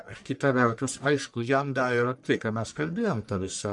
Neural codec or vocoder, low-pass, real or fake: codec, 44.1 kHz, 1.7 kbps, Pupu-Codec; 10.8 kHz; fake